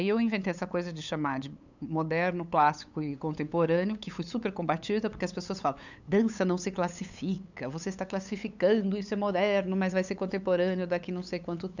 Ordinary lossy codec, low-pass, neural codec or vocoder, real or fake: none; 7.2 kHz; codec, 16 kHz, 8 kbps, FunCodec, trained on LibriTTS, 25 frames a second; fake